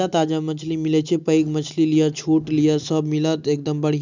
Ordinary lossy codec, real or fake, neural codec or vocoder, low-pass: none; real; none; 7.2 kHz